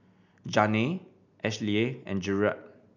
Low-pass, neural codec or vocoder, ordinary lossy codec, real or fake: 7.2 kHz; none; none; real